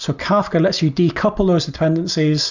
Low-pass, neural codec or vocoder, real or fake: 7.2 kHz; none; real